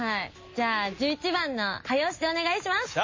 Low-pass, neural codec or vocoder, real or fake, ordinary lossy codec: 7.2 kHz; none; real; MP3, 32 kbps